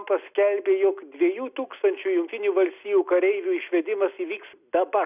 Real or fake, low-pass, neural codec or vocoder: real; 3.6 kHz; none